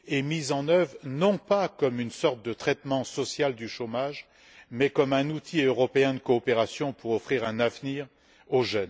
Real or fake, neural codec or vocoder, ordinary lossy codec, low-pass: real; none; none; none